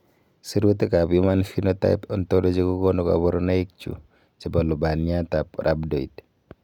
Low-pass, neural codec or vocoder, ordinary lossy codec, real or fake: 19.8 kHz; none; none; real